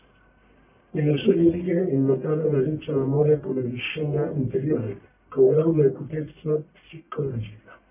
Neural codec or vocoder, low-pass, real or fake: codec, 44.1 kHz, 1.7 kbps, Pupu-Codec; 3.6 kHz; fake